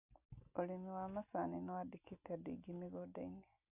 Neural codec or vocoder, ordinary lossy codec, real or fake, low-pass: none; none; real; 3.6 kHz